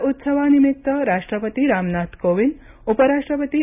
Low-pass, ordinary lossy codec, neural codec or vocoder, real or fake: 3.6 kHz; none; none; real